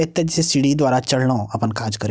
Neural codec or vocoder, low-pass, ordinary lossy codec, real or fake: none; none; none; real